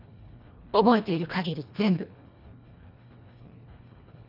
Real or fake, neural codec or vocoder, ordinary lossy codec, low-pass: fake; codec, 24 kHz, 1.5 kbps, HILCodec; none; 5.4 kHz